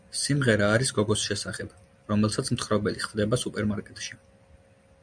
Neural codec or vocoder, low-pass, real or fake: none; 9.9 kHz; real